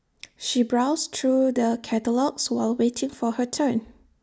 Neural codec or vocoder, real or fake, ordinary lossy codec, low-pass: none; real; none; none